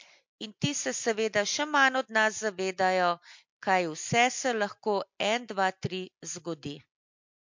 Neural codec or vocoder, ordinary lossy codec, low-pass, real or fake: none; MP3, 48 kbps; 7.2 kHz; real